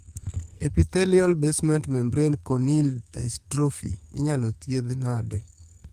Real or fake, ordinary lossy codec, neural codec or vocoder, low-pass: fake; Opus, 32 kbps; codec, 44.1 kHz, 2.6 kbps, SNAC; 14.4 kHz